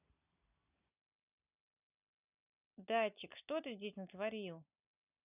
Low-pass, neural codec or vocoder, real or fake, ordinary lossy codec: 3.6 kHz; none; real; none